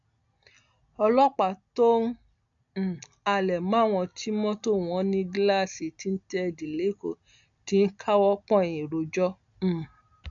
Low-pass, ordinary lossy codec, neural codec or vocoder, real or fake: 7.2 kHz; none; none; real